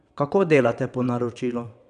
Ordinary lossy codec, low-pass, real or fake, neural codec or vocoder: none; 9.9 kHz; fake; vocoder, 22.05 kHz, 80 mel bands, WaveNeXt